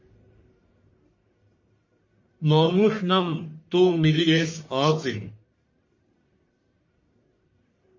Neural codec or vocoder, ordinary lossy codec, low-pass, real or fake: codec, 44.1 kHz, 1.7 kbps, Pupu-Codec; MP3, 32 kbps; 7.2 kHz; fake